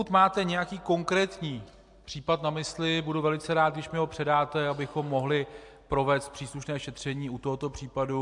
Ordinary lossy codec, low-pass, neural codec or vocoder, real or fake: MP3, 64 kbps; 10.8 kHz; none; real